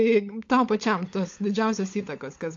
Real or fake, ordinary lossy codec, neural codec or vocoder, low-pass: fake; AAC, 48 kbps; codec, 16 kHz, 16 kbps, FunCodec, trained on LibriTTS, 50 frames a second; 7.2 kHz